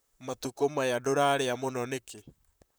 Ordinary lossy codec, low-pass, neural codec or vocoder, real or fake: none; none; vocoder, 44.1 kHz, 128 mel bands, Pupu-Vocoder; fake